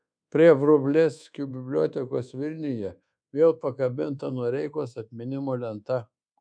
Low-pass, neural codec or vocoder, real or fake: 9.9 kHz; codec, 24 kHz, 1.2 kbps, DualCodec; fake